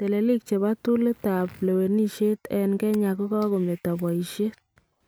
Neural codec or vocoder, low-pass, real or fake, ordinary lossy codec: none; none; real; none